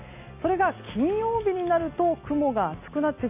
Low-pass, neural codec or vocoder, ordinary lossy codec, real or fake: 3.6 kHz; none; none; real